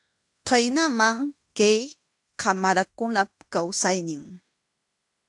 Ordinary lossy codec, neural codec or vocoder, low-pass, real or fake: AAC, 64 kbps; codec, 16 kHz in and 24 kHz out, 0.9 kbps, LongCat-Audio-Codec, fine tuned four codebook decoder; 10.8 kHz; fake